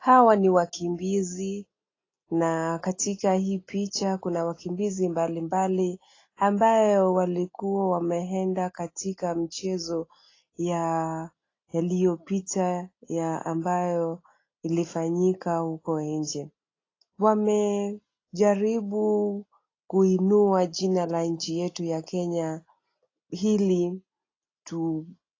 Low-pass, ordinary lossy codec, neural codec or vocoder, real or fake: 7.2 kHz; AAC, 32 kbps; none; real